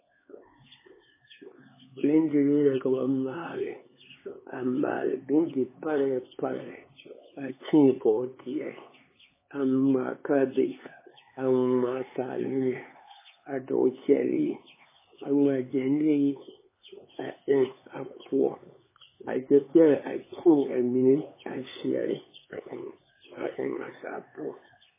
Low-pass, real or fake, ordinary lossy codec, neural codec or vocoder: 3.6 kHz; fake; MP3, 16 kbps; codec, 16 kHz, 4 kbps, X-Codec, HuBERT features, trained on LibriSpeech